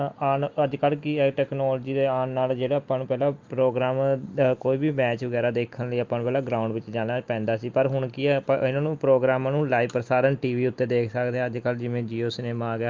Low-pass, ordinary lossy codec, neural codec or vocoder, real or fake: 7.2 kHz; Opus, 32 kbps; none; real